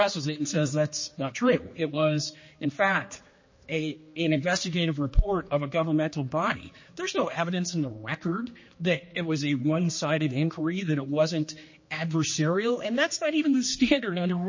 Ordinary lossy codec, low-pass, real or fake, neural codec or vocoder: MP3, 32 kbps; 7.2 kHz; fake; codec, 16 kHz, 2 kbps, X-Codec, HuBERT features, trained on general audio